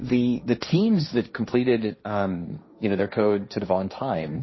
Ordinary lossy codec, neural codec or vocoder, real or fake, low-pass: MP3, 24 kbps; codec, 16 kHz, 1.1 kbps, Voila-Tokenizer; fake; 7.2 kHz